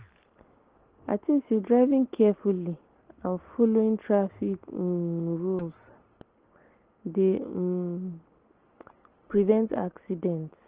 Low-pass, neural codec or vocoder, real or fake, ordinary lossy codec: 3.6 kHz; none; real; Opus, 16 kbps